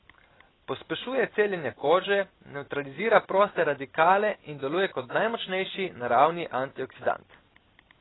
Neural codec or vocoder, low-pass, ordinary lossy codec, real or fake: none; 7.2 kHz; AAC, 16 kbps; real